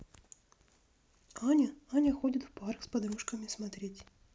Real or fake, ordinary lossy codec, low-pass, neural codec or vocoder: real; none; none; none